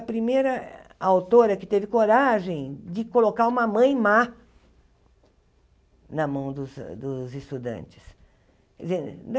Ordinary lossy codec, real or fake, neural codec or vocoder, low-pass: none; real; none; none